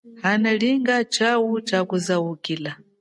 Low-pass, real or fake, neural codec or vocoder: 10.8 kHz; real; none